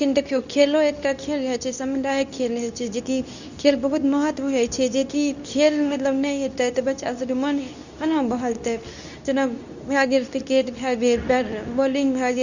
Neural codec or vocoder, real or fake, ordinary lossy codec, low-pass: codec, 24 kHz, 0.9 kbps, WavTokenizer, medium speech release version 2; fake; none; 7.2 kHz